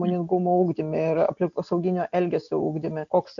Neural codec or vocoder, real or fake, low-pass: none; real; 7.2 kHz